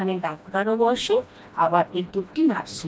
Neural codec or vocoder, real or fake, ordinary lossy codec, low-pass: codec, 16 kHz, 1 kbps, FreqCodec, smaller model; fake; none; none